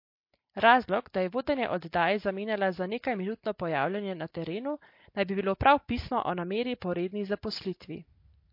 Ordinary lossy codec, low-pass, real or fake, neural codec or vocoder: MP3, 32 kbps; 5.4 kHz; real; none